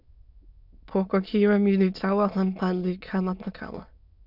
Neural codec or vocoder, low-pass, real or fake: autoencoder, 22.05 kHz, a latent of 192 numbers a frame, VITS, trained on many speakers; 5.4 kHz; fake